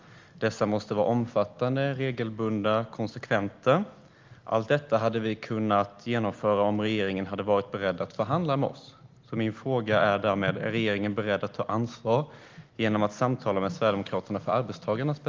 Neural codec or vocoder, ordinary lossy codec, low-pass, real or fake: none; Opus, 32 kbps; 7.2 kHz; real